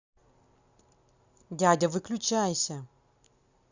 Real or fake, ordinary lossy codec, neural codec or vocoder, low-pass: real; Opus, 64 kbps; none; 7.2 kHz